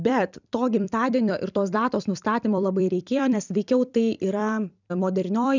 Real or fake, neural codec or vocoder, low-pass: fake; vocoder, 44.1 kHz, 128 mel bands every 256 samples, BigVGAN v2; 7.2 kHz